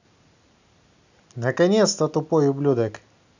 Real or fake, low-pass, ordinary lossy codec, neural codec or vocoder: real; 7.2 kHz; none; none